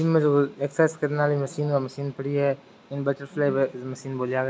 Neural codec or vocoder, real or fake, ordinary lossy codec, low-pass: none; real; none; none